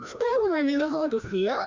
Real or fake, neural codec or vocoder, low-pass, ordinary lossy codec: fake; codec, 16 kHz, 1 kbps, FreqCodec, larger model; 7.2 kHz; MP3, 64 kbps